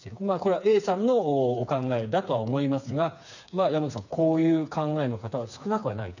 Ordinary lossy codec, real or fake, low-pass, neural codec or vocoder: none; fake; 7.2 kHz; codec, 16 kHz, 4 kbps, FreqCodec, smaller model